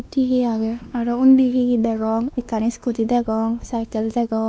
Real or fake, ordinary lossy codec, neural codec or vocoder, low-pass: fake; none; codec, 16 kHz, 2 kbps, X-Codec, WavLM features, trained on Multilingual LibriSpeech; none